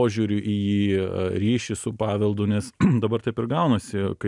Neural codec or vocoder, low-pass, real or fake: none; 10.8 kHz; real